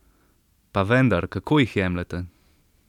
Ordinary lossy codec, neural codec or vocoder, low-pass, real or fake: none; none; 19.8 kHz; real